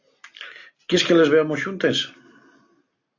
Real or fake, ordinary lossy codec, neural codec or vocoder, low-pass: real; AAC, 48 kbps; none; 7.2 kHz